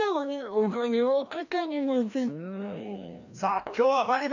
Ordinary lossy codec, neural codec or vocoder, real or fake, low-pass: none; codec, 16 kHz, 1 kbps, FreqCodec, larger model; fake; 7.2 kHz